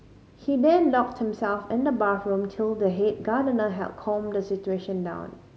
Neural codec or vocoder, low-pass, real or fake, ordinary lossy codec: none; none; real; none